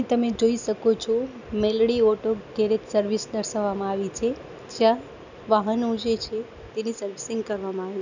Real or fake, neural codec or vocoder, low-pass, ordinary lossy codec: real; none; 7.2 kHz; none